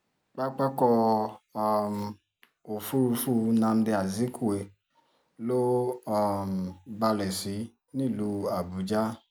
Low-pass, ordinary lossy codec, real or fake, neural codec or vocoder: none; none; real; none